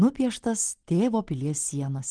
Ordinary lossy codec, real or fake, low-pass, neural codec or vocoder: Opus, 16 kbps; real; 9.9 kHz; none